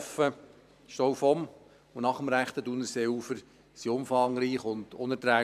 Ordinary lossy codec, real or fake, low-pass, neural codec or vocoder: none; fake; 14.4 kHz; vocoder, 44.1 kHz, 128 mel bands every 512 samples, BigVGAN v2